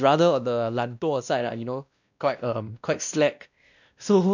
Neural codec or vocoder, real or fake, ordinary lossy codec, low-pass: codec, 16 kHz, 1 kbps, X-Codec, WavLM features, trained on Multilingual LibriSpeech; fake; none; 7.2 kHz